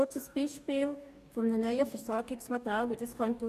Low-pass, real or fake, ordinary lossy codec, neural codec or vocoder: 14.4 kHz; fake; none; codec, 44.1 kHz, 2.6 kbps, DAC